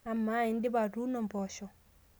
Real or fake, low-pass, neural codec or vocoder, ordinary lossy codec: real; none; none; none